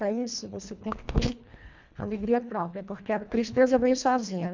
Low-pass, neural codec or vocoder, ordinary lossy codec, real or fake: 7.2 kHz; codec, 24 kHz, 1.5 kbps, HILCodec; MP3, 64 kbps; fake